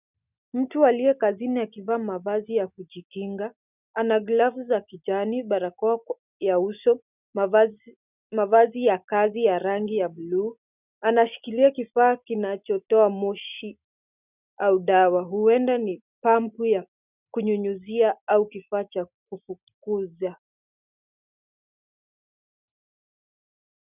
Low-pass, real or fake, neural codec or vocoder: 3.6 kHz; real; none